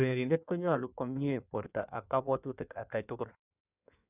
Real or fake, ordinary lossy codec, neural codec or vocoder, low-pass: fake; none; codec, 16 kHz in and 24 kHz out, 1.1 kbps, FireRedTTS-2 codec; 3.6 kHz